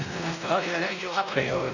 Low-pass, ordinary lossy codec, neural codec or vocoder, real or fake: 7.2 kHz; none; codec, 16 kHz, 1 kbps, X-Codec, WavLM features, trained on Multilingual LibriSpeech; fake